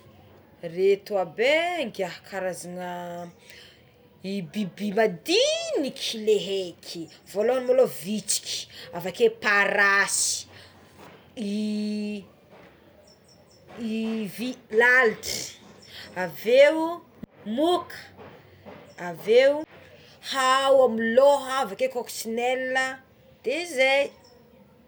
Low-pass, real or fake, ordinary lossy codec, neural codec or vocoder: none; real; none; none